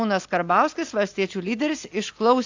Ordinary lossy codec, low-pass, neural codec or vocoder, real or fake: AAC, 48 kbps; 7.2 kHz; none; real